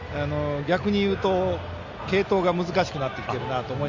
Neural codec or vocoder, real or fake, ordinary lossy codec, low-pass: none; real; Opus, 64 kbps; 7.2 kHz